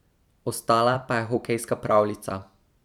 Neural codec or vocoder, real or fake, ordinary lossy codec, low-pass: vocoder, 44.1 kHz, 128 mel bands every 256 samples, BigVGAN v2; fake; none; 19.8 kHz